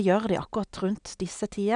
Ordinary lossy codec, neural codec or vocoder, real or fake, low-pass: none; none; real; 9.9 kHz